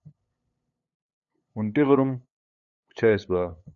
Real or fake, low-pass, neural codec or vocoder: fake; 7.2 kHz; codec, 16 kHz, 8 kbps, FunCodec, trained on LibriTTS, 25 frames a second